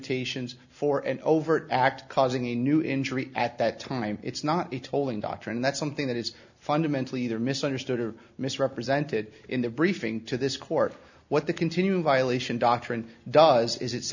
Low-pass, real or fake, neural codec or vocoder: 7.2 kHz; real; none